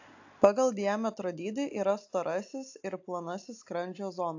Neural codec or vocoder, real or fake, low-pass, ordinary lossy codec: none; real; 7.2 kHz; AAC, 48 kbps